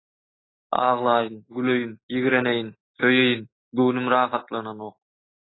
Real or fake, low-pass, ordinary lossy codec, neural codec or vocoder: real; 7.2 kHz; AAC, 16 kbps; none